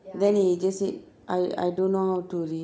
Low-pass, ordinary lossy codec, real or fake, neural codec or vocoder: none; none; real; none